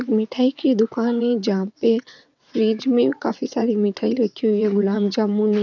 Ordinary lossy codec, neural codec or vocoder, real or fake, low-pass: none; vocoder, 22.05 kHz, 80 mel bands, WaveNeXt; fake; 7.2 kHz